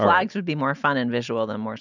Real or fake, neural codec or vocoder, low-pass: real; none; 7.2 kHz